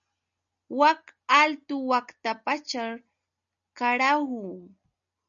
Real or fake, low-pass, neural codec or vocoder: real; 7.2 kHz; none